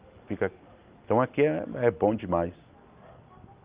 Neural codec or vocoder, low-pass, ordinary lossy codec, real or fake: none; 3.6 kHz; Opus, 32 kbps; real